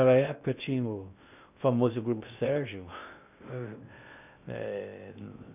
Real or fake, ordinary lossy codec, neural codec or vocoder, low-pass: fake; none; codec, 16 kHz in and 24 kHz out, 0.6 kbps, FocalCodec, streaming, 2048 codes; 3.6 kHz